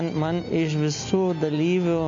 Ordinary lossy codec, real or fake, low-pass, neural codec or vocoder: MP3, 48 kbps; real; 7.2 kHz; none